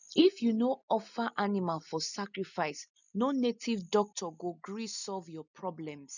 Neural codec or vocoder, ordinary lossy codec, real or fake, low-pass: none; none; real; 7.2 kHz